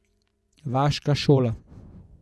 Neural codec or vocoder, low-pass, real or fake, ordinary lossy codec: vocoder, 24 kHz, 100 mel bands, Vocos; none; fake; none